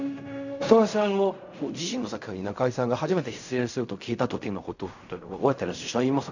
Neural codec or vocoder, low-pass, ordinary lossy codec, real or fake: codec, 16 kHz in and 24 kHz out, 0.4 kbps, LongCat-Audio-Codec, fine tuned four codebook decoder; 7.2 kHz; none; fake